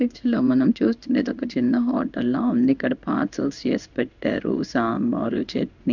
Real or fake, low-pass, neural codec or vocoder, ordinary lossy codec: fake; 7.2 kHz; codec, 16 kHz in and 24 kHz out, 1 kbps, XY-Tokenizer; none